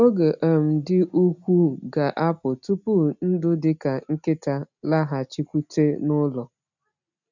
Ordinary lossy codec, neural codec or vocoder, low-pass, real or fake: none; none; 7.2 kHz; real